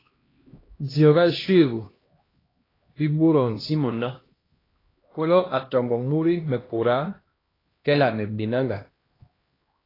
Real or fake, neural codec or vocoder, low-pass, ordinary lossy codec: fake; codec, 16 kHz, 1 kbps, X-Codec, HuBERT features, trained on LibriSpeech; 5.4 kHz; AAC, 24 kbps